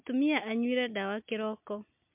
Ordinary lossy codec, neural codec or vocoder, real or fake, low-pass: MP3, 32 kbps; none; real; 3.6 kHz